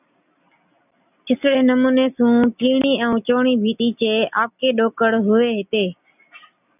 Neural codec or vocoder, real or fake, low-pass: none; real; 3.6 kHz